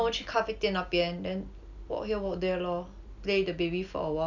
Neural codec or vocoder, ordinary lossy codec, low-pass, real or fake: none; none; 7.2 kHz; real